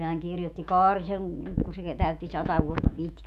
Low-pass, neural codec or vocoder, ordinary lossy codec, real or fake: 14.4 kHz; autoencoder, 48 kHz, 128 numbers a frame, DAC-VAE, trained on Japanese speech; none; fake